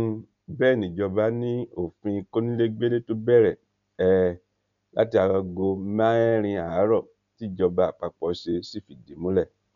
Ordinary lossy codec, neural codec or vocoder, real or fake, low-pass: none; none; real; 7.2 kHz